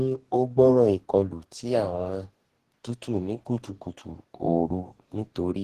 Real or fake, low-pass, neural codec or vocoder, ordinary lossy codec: fake; 14.4 kHz; codec, 44.1 kHz, 2.6 kbps, DAC; Opus, 16 kbps